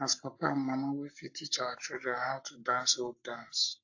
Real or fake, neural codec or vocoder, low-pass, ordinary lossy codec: fake; codec, 44.1 kHz, 7.8 kbps, Pupu-Codec; 7.2 kHz; AAC, 48 kbps